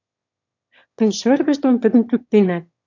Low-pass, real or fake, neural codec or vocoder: 7.2 kHz; fake; autoencoder, 22.05 kHz, a latent of 192 numbers a frame, VITS, trained on one speaker